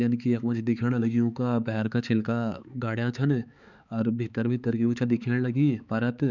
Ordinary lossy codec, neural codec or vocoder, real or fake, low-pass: none; codec, 16 kHz, 4 kbps, X-Codec, HuBERT features, trained on balanced general audio; fake; 7.2 kHz